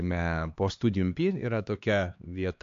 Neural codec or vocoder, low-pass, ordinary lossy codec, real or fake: codec, 16 kHz, 2 kbps, X-Codec, HuBERT features, trained on LibriSpeech; 7.2 kHz; AAC, 64 kbps; fake